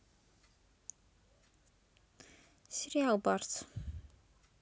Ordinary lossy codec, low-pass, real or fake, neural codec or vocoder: none; none; real; none